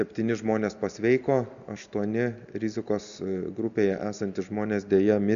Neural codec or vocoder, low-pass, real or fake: none; 7.2 kHz; real